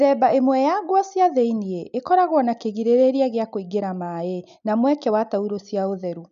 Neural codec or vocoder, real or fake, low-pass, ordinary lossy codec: none; real; 7.2 kHz; none